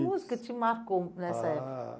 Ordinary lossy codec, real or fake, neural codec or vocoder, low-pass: none; real; none; none